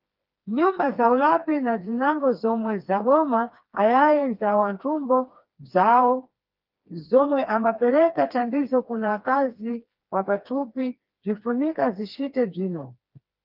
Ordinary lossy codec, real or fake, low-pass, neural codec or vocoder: Opus, 24 kbps; fake; 5.4 kHz; codec, 16 kHz, 2 kbps, FreqCodec, smaller model